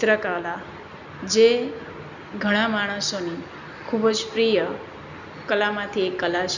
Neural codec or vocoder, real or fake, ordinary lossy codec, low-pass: none; real; none; 7.2 kHz